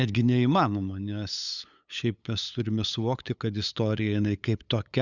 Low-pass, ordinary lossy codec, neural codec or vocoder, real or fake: 7.2 kHz; Opus, 64 kbps; codec, 16 kHz, 8 kbps, FunCodec, trained on LibriTTS, 25 frames a second; fake